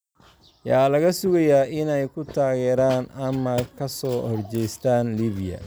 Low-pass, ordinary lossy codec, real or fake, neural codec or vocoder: none; none; real; none